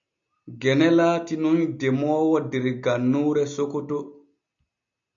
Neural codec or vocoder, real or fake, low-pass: none; real; 7.2 kHz